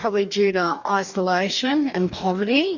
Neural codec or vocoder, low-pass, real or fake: codec, 44.1 kHz, 2.6 kbps, DAC; 7.2 kHz; fake